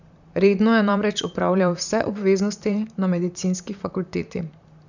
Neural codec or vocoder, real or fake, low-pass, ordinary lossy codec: vocoder, 44.1 kHz, 128 mel bands, Pupu-Vocoder; fake; 7.2 kHz; none